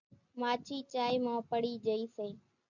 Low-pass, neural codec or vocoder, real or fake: 7.2 kHz; none; real